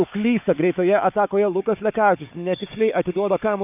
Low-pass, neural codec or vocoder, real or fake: 3.6 kHz; codec, 16 kHz, 4 kbps, FunCodec, trained on Chinese and English, 50 frames a second; fake